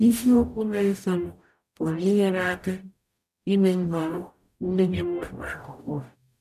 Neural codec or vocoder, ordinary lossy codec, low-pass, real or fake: codec, 44.1 kHz, 0.9 kbps, DAC; none; 14.4 kHz; fake